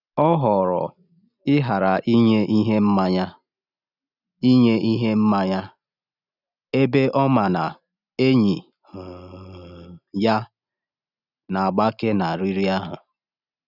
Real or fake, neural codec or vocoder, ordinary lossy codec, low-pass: real; none; none; 5.4 kHz